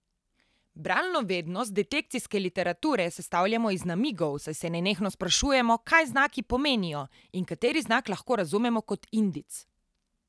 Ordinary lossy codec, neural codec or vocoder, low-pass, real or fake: none; none; none; real